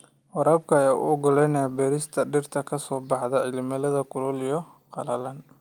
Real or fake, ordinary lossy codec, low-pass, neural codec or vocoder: real; Opus, 32 kbps; 19.8 kHz; none